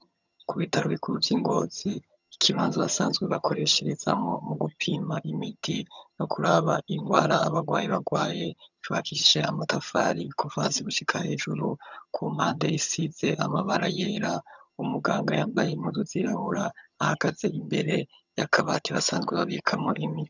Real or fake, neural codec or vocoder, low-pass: fake; vocoder, 22.05 kHz, 80 mel bands, HiFi-GAN; 7.2 kHz